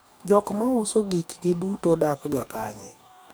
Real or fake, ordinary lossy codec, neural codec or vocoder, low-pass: fake; none; codec, 44.1 kHz, 2.6 kbps, DAC; none